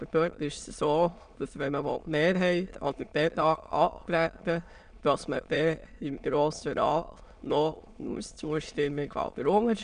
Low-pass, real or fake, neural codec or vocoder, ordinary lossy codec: 9.9 kHz; fake; autoencoder, 22.05 kHz, a latent of 192 numbers a frame, VITS, trained on many speakers; none